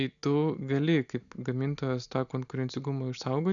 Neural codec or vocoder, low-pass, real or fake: none; 7.2 kHz; real